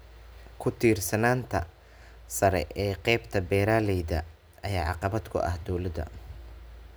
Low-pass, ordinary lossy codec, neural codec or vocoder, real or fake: none; none; none; real